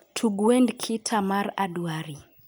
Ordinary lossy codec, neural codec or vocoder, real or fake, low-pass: none; none; real; none